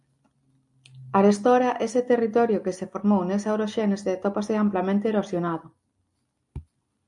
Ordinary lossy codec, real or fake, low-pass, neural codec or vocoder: MP3, 64 kbps; real; 10.8 kHz; none